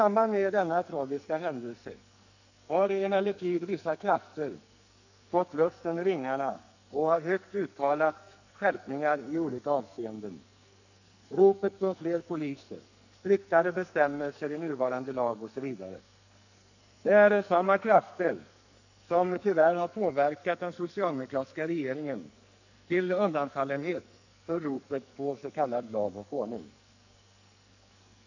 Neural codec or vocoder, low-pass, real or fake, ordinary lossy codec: codec, 44.1 kHz, 2.6 kbps, SNAC; 7.2 kHz; fake; none